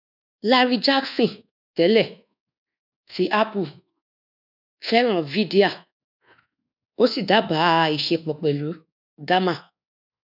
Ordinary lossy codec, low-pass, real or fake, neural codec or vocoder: none; 5.4 kHz; fake; codec, 24 kHz, 1.2 kbps, DualCodec